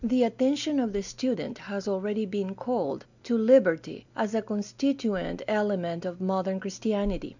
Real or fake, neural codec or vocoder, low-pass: real; none; 7.2 kHz